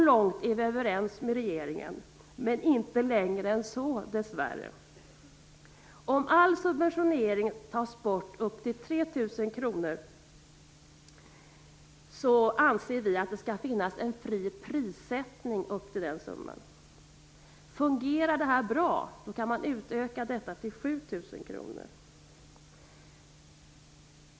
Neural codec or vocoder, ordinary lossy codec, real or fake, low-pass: none; none; real; none